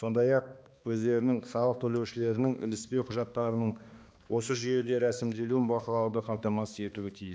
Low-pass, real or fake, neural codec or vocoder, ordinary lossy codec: none; fake; codec, 16 kHz, 2 kbps, X-Codec, HuBERT features, trained on balanced general audio; none